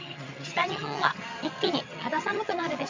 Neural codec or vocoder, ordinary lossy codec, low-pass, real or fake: vocoder, 22.05 kHz, 80 mel bands, HiFi-GAN; MP3, 48 kbps; 7.2 kHz; fake